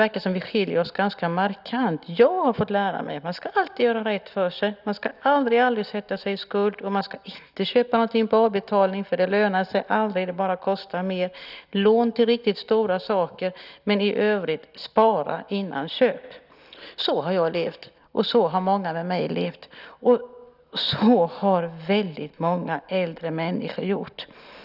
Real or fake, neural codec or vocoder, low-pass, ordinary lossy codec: real; none; 5.4 kHz; none